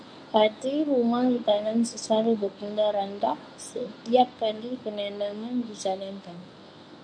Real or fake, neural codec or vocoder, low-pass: fake; codec, 24 kHz, 0.9 kbps, WavTokenizer, medium speech release version 1; 9.9 kHz